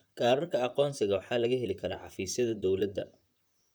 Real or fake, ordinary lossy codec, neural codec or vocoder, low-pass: fake; none; vocoder, 44.1 kHz, 128 mel bands, Pupu-Vocoder; none